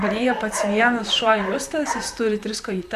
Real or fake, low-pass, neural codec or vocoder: fake; 14.4 kHz; vocoder, 44.1 kHz, 128 mel bands, Pupu-Vocoder